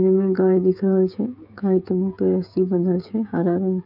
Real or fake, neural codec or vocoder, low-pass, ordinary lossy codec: fake; codec, 16 kHz, 16 kbps, FreqCodec, smaller model; 5.4 kHz; none